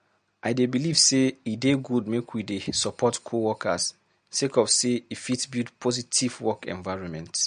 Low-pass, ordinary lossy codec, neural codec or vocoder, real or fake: 9.9 kHz; MP3, 48 kbps; none; real